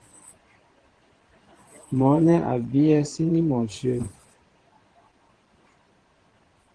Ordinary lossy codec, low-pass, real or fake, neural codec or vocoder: Opus, 16 kbps; 9.9 kHz; fake; vocoder, 22.05 kHz, 80 mel bands, Vocos